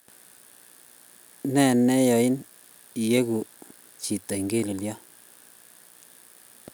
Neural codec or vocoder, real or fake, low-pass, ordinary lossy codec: none; real; none; none